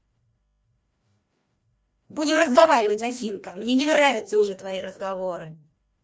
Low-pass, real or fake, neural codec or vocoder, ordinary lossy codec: none; fake; codec, 16 kHz, 1 kbps, FreqCodec, larger model; none